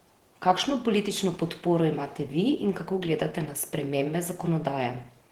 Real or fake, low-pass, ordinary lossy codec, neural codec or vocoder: fake; 19.8 kHz; Opus, 16 kbps; vocoder, 48 kHz, 128 mel bands, Vocos